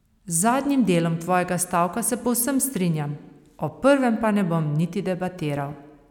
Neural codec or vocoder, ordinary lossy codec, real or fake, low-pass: none; none; real; 19.8 kHz